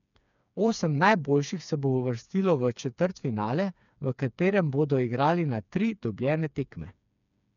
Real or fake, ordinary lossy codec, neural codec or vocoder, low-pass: fake; none; codec, 16 kHz, 4 kbps, FreqCodec, smaller model; 7.2 kHz